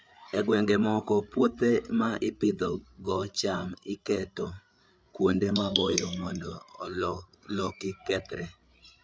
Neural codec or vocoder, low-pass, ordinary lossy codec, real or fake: codec, 16 kHz, 8 kbps, FreqCodec, larger model; none; none; fake